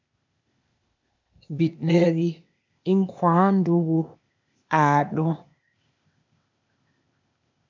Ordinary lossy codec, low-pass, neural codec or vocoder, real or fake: MP3, 64 kbps; 7.2 kHz; codec, 16 kHz, 0.8 kbps, ZipCodec; fake